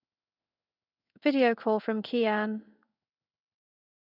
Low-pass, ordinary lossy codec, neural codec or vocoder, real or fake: 5.4 kHz; none; codec, 16 kHz in and 24 kHz out, 1 kbps, XY-Tokenizer; fake